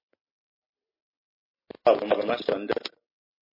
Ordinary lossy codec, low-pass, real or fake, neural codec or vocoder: MP3, 24 kbps; 5.4 kHz; real; none